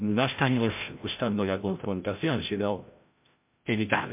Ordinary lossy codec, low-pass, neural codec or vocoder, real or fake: MP3, 32 kbps; 3.6 kHz; codec, 16 kHz, 0.5 kbps, FreqCodec, larger model; fake